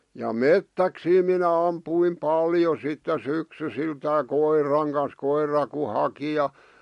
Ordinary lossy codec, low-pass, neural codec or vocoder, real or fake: MP3, 48 kbps; 14.4 kHz; none; real